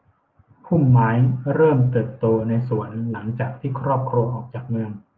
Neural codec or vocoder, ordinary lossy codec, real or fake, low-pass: none; Opus, 32 kbps; real; 7.2 kHz